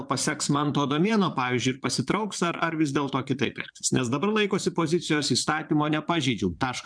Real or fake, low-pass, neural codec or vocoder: fake; 9.9 kHz; vocoder, 22.05 kHz, 80 mel bands, Vocos